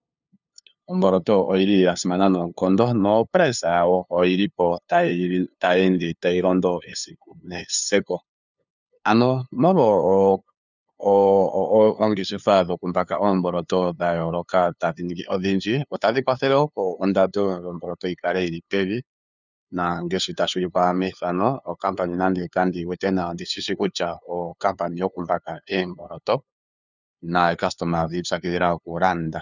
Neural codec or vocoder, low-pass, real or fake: codec, 16 kHz, 2 kbps, FunCodec, trained on LibriTTS, 25 frames a second; 7.2 kHz; fake